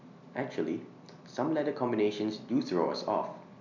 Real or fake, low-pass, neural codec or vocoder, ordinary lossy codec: real; 7.2 kHz; none; none